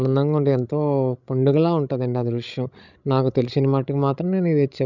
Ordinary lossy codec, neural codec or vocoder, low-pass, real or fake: none; codec, 16 kHz, 16 kbps, FreqCodec, larger model; 7.2 kHz; fake